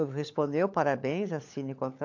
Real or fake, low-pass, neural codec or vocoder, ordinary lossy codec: fake; 7.2 kHz; codec, 16 kHz, 16 kbps, FunCodec, trained on LibriTTS, 50 frames a second; AAC, 48 kbps